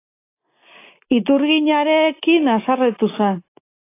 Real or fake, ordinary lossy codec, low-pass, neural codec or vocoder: real; AAC, 24 kbps; 3.6 kHz; none